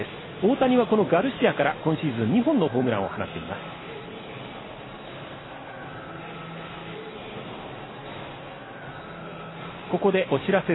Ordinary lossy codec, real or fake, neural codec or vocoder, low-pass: AAC, 16 kbps; real; none; 7.2 kHz